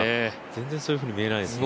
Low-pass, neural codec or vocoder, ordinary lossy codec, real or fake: none; none; none; real